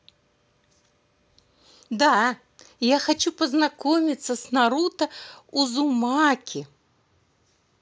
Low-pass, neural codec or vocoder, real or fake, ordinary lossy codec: none; none; real; none